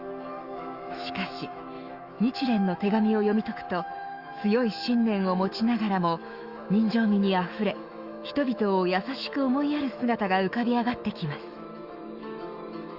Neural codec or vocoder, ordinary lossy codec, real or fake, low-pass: autoencoder, 48 kHz, 128 numbers a frame, DAC-VAE, trained on Japanese speech; Opus, 64 kbps; fake; 5.4 kHz